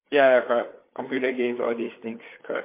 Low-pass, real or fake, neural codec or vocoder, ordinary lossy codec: 3.6 kHz; fake; codec, 16 kHz, 4 kbps, FreqCodec, larger model; MP3, 24 kbps